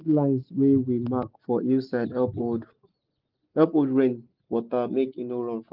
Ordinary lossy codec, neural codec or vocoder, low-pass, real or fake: Opus, 24 kbps; none; 5.4 kHz; real